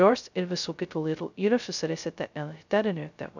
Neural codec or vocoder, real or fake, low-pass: codec, 16 kHz, 0.2 kbps, FocalCodec; fake; 7.2 kHz